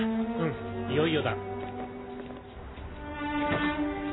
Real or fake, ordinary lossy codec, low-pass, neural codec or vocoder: real; AAC, 16 kbps; 7.2 kHz; none